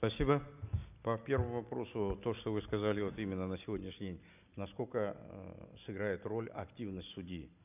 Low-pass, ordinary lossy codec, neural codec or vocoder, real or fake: 3.6 kHz; none; none; real